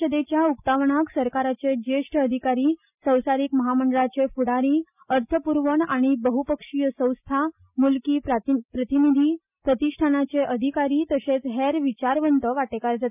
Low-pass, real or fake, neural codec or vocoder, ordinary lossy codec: 3.6 kHz; real; none; none